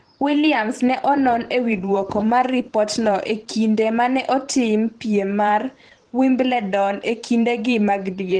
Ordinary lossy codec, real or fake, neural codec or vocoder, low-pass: Opus, 16 kbps; fake; vocoder, 44.1 kHz, 128 mel bands every 512 samples, BigVGAN v2; 9.9 kHz